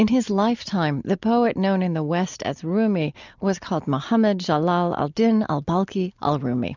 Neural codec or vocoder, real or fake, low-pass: none; real; 7.2 kHz